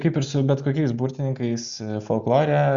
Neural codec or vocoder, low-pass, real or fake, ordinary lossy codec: none; 7.2 kHz; real; Opus, 64 kbps